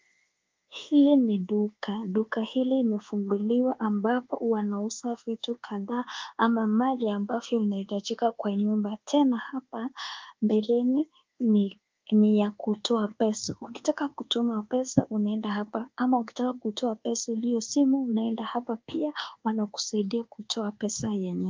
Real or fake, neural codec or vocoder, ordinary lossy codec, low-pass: fake; codec, 24 kHz, 1.2 kbps, DualCodec; Opus, 32 kbps; 7.2 kHz